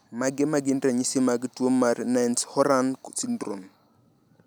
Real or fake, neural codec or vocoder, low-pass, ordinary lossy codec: real; none; none; none